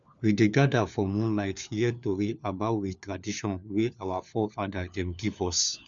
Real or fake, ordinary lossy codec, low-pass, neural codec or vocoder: fake; none; 7.2 kHz; codec, 16 kHz, 4 kbps, FunCodec, trained on LibriTTS, 50 frames a second